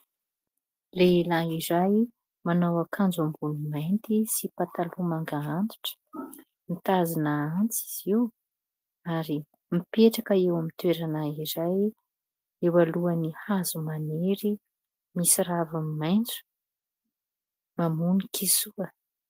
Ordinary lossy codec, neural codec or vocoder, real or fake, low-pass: Opus, 32 kbps; none; real; 14.4 kHz